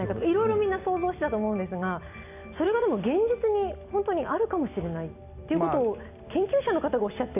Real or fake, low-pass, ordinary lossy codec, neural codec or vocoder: real; 3.6 kHz; none; none